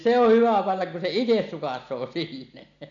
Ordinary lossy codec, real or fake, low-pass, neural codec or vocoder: none; real; 7.2 kHz; none